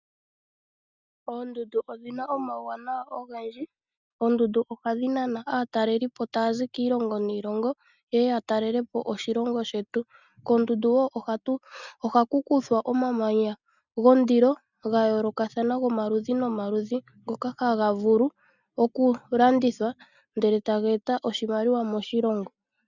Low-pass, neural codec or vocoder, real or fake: 7.2 kHz; none; real